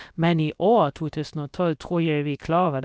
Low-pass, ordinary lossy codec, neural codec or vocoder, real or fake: none; none; codec, 16 kHz, about 1 kbps, DyCAST, with the encoder's durations; fake